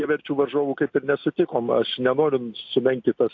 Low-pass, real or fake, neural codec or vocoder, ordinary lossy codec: 7.2 kHz; real; none; AAC, 48 kbps